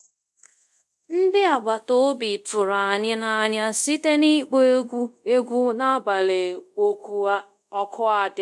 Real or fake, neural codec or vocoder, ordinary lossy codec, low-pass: fake; codec, 24 kHz, 0.5 kbps, DualCodec; none; none